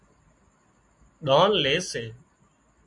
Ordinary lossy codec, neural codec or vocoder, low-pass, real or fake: MP3, 64 kbps; none; 10.8 kHz; real